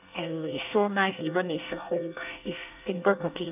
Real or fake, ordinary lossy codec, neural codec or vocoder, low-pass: fake; none; codec, 24 kHz, 1 kbps, SNAC; 3.6 kHz